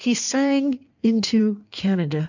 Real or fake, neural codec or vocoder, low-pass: fake; codec, 16 kHz in and 24 kHz out, 1.1 kbps, FireRedTTS-2 codec; 7.2 kHz